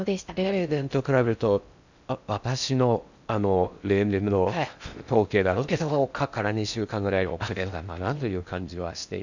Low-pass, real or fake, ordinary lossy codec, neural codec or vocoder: 7.2 kHz; fake; none; codec, 16 kHz in and 24 kHz out, 0.6 kbps, FocalCodec, streaming, 4096 codes